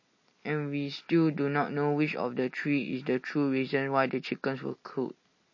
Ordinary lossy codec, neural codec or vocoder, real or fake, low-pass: MP3, 32 kbps; none; real; 7.2 kHz